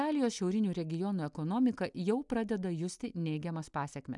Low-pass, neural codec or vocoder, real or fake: 10.8 kHz; none; real